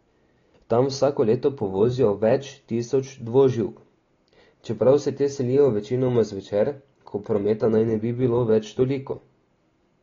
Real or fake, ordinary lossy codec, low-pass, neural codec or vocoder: real; AAC, 32 kbps; 7.2 kHz; none